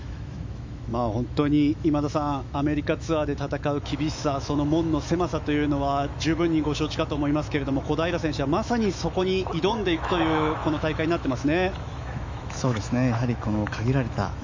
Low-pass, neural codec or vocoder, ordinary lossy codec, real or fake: 7.2 kHz; autoencoder, 48 kHz, 128 numbers a frame, DAC-VAE, trained on Japanese speech; MP3, 64 kbps; fake